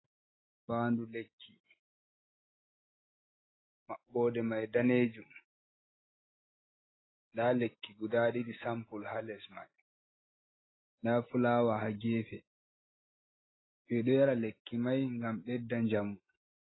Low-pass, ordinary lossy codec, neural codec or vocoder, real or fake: 7.2 kHz; AAC, 16 kbps; none; real